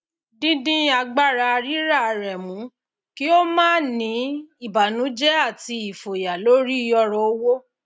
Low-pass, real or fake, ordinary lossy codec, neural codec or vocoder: none; real; none; none